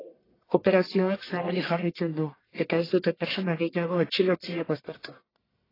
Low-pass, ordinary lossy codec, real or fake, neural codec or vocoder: 5.4 kHz; AAC, 24 kbps; fake; codec, 44.1 kHz, 1.7 kbps, Pupu-Codec